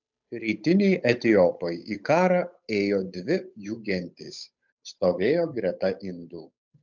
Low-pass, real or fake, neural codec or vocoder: 7.2 kHz; fake; codec, 16 kHz, 8 kbps, FunCodec, trained on Chinese and English, 25 frames a second